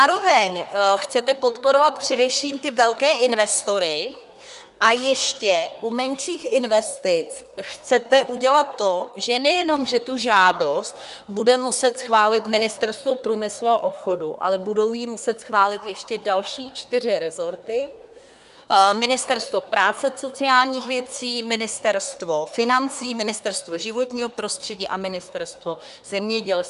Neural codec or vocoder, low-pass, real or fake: codec, 24 kHz, 1 kbps, SNAC; 10.8 kHz; fake